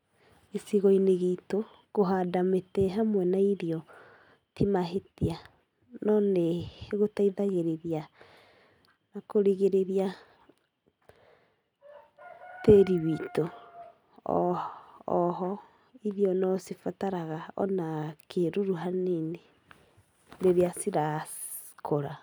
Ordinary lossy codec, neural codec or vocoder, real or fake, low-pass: none; none; real; 19.8 kHz